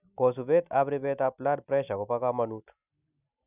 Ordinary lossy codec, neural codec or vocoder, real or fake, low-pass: none; none; real; 3.6 kHz